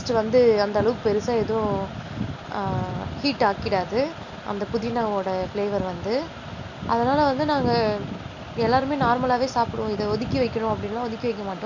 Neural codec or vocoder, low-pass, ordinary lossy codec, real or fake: none; 7.2 kHz; none; real